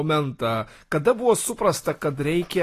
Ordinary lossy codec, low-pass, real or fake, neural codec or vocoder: AAC, 48 kbps; 14.4 kHz; real; none